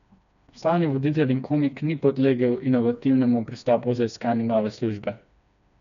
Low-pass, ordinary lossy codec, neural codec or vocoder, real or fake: 7.2 kHz; none; codec, 16 kHz, 2 kbps, FreqCodec, smaller model; fake